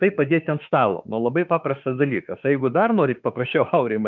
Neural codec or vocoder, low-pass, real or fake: autoencoder, 48 kHz, 32 numbers a frame, DAC-VAE, trained on Japanese speech; 7.2 kHz; fake